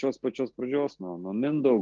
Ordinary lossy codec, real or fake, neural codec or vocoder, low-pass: Opus, 16 kbps; real; none; 7.2 kHz